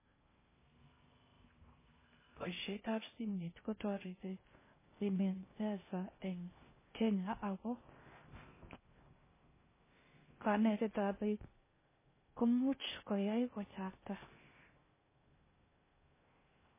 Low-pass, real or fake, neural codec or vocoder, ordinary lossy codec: 3.6 kHz; fake; codec, 16 kHz in and 24 kHz out, 0.6 kbps, FocalCodec, streaming, 2048 codes; MP3, 16 kbps